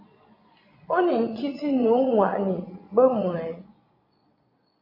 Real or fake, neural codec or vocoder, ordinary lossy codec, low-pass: fake; vocoder, 22.05 kHz, 80 mel bands, Vocos; MP3, 32 kbps; 5.4 kHz